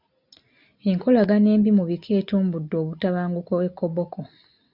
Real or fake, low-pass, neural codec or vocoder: real; 5.4 kHz; none